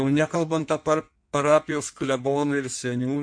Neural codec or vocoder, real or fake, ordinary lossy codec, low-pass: codec, 16 kHz in and 24 kHz out, 1.1 kbps, FireRedTTS-2 codec; fake; MP3, 64 kbps; 9.9 kHz